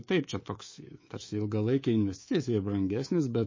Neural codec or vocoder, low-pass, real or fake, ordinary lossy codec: codec, 24 kHz, 3.1 kbps, DualCodec; 7.2 kHz; fake; MP3, 32 kbps